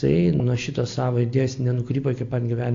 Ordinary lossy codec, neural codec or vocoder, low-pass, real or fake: AAC, 48 kbps; none; 7.2 kHz; real